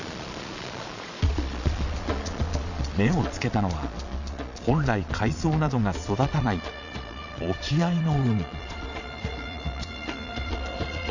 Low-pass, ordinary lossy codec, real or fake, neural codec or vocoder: 7.2 kHz; none; fake; vocoder, 22.05 kHz, 80 mel bands, Vocos